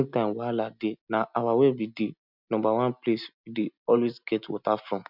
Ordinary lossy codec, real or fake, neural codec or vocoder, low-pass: none; real; none; 5.4 kHz